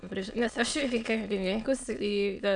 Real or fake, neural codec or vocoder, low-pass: fake; autoencoder, 22.05 kHz, a latent of 192 numbers a frame, VITS, trained on many speakers; 9.9 kHz